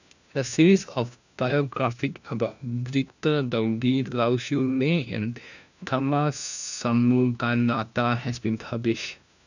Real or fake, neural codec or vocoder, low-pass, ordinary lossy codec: fake; codec, 16 kHz, 1 kbps, FunCodec, trained on LibriTTS, 50 frames a second; 7.2 kHz; none